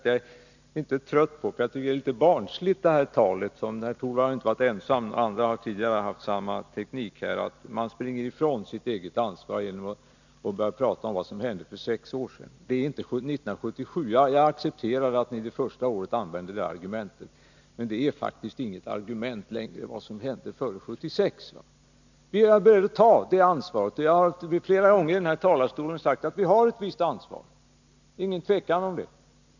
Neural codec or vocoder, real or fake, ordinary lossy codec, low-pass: none; real; none; 7.2 kHz